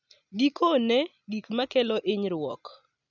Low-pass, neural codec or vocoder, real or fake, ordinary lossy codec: 7.2 kHz; none; real; none